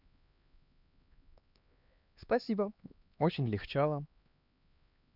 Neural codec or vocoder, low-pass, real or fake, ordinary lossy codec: codec, 16 kHz, 2 kbps, X-Codec, HuBERT features, trained on LibriSpeech; 5.4 kHz; fake; none